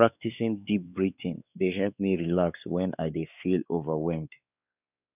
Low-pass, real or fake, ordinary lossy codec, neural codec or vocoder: 3.6 kHz; fake; none; codec, 16 kHz, 4 kbps, X-Codec, WavLM features, trained on Multilingual LibriSpeech